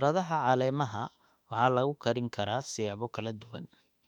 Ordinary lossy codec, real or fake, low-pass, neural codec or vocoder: none; fake; 19.8 kHz; autoencoder, 48 kHz, 32 numbers a frame, DAC-VAE, trained on Japanese speech